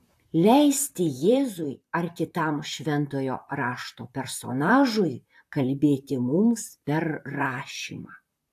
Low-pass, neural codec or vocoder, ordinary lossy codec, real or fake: 14.4 kHz; vocoder, 44.1 kHz, 128 mel bands, Pupu-Vocoder; AAC, 64 kbps; fake